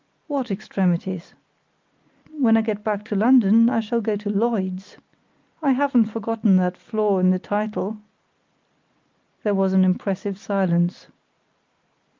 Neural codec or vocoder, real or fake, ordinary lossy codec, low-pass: none; real; Opus, 24 kbps; 7.2 kHz